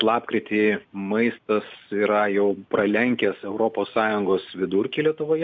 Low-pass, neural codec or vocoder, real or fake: 7.2 kHz; none; real